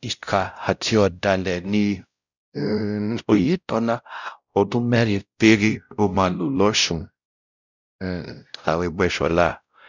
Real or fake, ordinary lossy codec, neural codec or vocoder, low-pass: fake; none; codec, 16 kHz, 0.5 kbps, X-Codec, WavLM features, trained on Multilingual LibriSpeech; 7.2 kHz